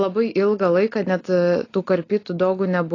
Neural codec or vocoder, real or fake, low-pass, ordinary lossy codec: none; real; 7.2 kHz; AAC, 32 kbps